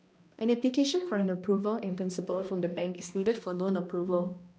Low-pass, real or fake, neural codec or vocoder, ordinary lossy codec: none; fake; codec, 16 kHz, 1 kbps, X-Codec, HuBERT features, trained on balanced general audio; none